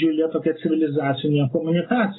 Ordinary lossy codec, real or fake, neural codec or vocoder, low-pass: AAC, 16 kbps; real; none; 7.2 kHz